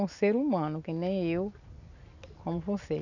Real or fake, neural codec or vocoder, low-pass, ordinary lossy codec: real; none; 7.2 kHz; none